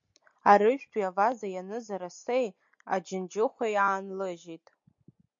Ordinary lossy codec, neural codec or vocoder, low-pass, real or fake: MP3, 48 kbps; none; 7.2 kHz; real